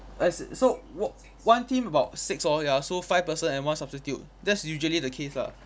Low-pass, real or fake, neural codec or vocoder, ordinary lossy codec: none; real; none; none